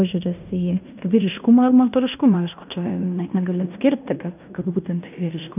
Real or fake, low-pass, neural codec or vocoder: fake; 3.6 kHz; codec, 16 kHz in and 24 kHz out, 0.9 kbps, LongCat-Audio-Codec, fine tuned four codebook decoder